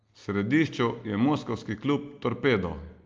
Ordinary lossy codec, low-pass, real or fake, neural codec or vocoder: Opus, 24 kbps; 7.2 kHz; real; none